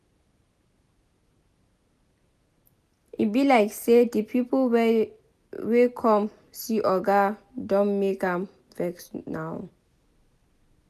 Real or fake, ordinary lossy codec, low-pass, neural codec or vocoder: real; none; 14.4 kHz; none